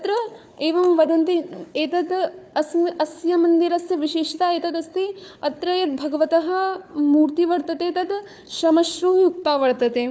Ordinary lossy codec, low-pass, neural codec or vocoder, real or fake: none; none; codec, 16 kHz, 4 kbps, FunCodec, trained on Chinese and English, 50 frames a second; fake